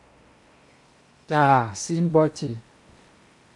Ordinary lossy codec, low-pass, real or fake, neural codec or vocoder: MP3, 64 kbps; 10.8 kHz; fake; codec, 16 kHz in and 24 kHz out, 0.8 kbps, FocalCodec, streaming, 65536 codes